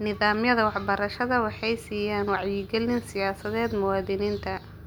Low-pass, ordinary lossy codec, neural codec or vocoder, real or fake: none; none; none; real